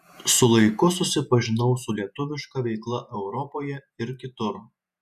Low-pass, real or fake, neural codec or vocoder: 14.4 kHz; fake; vocoder, 48 kHz, 128 mel bands, Vocos